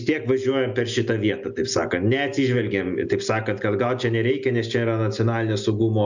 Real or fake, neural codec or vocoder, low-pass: real; none; 7.2 kHz